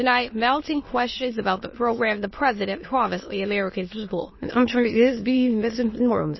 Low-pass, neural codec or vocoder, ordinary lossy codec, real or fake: 7.2 kHz; autoencoder, 22.05 kHz, a latent of 192 numbers a frame, VITS, trained on many speakers; MP3, 24 kbps; fake